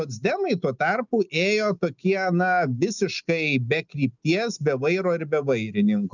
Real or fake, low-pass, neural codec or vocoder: real; 7.2 kHz; none